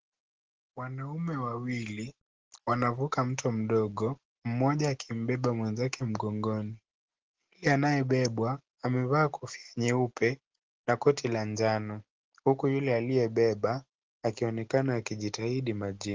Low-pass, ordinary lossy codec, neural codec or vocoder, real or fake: 7.2 kHz; Opus, 16 kbps; none; real